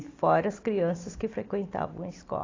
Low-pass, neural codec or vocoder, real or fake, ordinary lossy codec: 7.2 kHz; none; real; AAC, 32 kbps